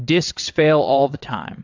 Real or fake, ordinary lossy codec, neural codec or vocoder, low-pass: fake; AAC, 48 kbps; vocoder, 22.05 kHz, 80 mel bands, WaveNeXt; 7.2 kHz